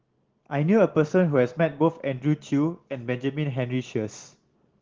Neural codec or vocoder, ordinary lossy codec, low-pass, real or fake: none; Opus, 16 kbps; 7.2 kHz; real